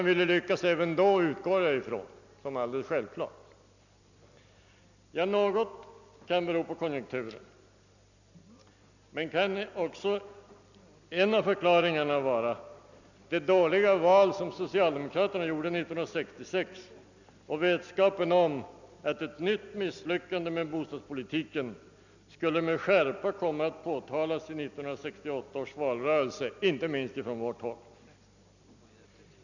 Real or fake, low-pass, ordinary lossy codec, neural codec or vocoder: real; 7.2 kHz; none; none